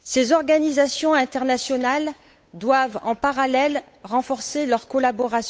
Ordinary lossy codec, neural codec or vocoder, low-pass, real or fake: none; codec, 16 kHz, 8 kbps, FunCodec, trained on Chinese and English, 25 frames a second; none; fake